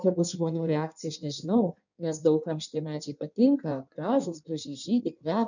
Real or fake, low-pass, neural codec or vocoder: fake; 7.2 kHz; codec, 16 kHz in and 24 kHz out, 1.1 kbps, FireRedTTS-2 codec